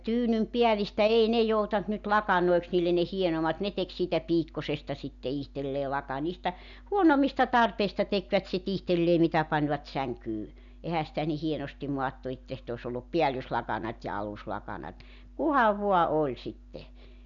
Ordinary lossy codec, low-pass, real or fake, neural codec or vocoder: none; 7.2 kHz; real; none